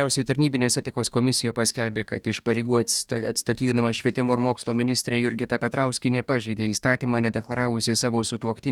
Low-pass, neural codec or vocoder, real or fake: 19.8 kHz; codec, 44.1 kHz, 2.6 kbps, DAC; fake